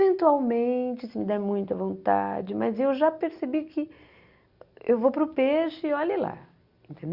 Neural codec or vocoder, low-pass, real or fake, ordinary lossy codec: none; 5.4 kHz; real; Opus, 64 kbps